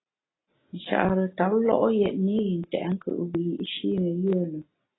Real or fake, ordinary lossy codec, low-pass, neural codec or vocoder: real; AAC, 16 kbps; 7.2 kHz; none